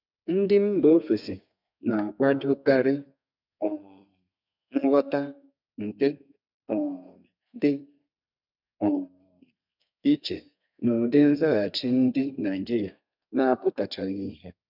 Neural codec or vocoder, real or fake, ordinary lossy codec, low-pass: codec, 32 kHz, 1.9 kbps, SNAC; fake; none; 5.4 kHz